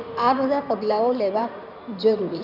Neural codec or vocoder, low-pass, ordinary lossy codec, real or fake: codec, 16 kHz in and 24 kHz out, 2.2 kbps, FireRedTTS-2 codec; 5.4 kHz; none; fake